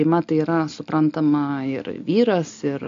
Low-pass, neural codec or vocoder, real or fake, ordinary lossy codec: 7.2 kHz; none; real; MP3, 48 kbps